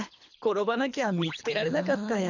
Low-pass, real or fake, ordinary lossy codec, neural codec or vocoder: 7.2 kHz; fake; none; codec, 24 kHz, 3 kbps, HILCodec